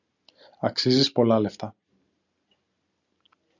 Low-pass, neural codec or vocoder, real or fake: 7.2 kHz; none; real